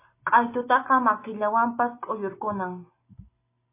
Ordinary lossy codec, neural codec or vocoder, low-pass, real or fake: MP3, 24 kbps; none; 3.6 kHz; real